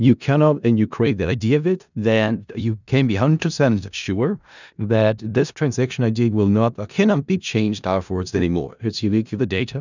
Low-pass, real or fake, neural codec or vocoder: 7.2 kHz; fake; codec, 16 kHz in and 24 kHz out, 0.4 kbps, LongCat-Audio-Codec, four codebook decoder